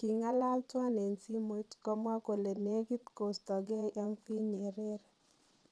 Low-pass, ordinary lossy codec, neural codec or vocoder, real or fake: none; none; vocoder, 22.05 kHz, 80 mel bands, Vocos; fake